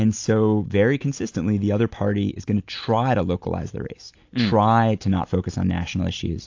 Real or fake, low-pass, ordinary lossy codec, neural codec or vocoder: real; 7.2 kHz; AAC, 48 kbps; none